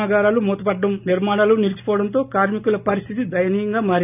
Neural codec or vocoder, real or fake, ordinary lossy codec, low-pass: none; real; none; 3.6 kHz